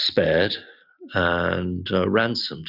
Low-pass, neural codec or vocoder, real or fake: 5.4 kHz; none; real